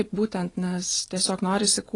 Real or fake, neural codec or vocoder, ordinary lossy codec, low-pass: fake; vocoder, 44.1 kHz, 128 mel bands every 512 samples, BigVGAN v2; AAC, 32 kbps; 10.8 kHz